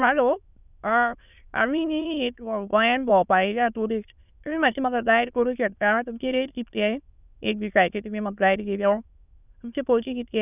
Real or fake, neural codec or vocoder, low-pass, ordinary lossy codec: fake; autoencoder, 22.05 kHz, a latent of 192 numbers a frame, VITS, trained on many speakers; 3.6 kHz; none